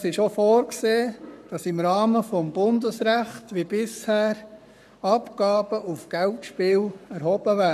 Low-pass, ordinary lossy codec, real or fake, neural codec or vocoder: 14.4 kHz; none; fake; codec, 44.1 kHz, 7.8 kbps, Pupu-Codec